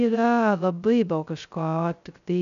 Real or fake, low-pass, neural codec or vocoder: fake; 7.2 kHz; codec, 16 kHz, 0.2 kbps, FocalCodec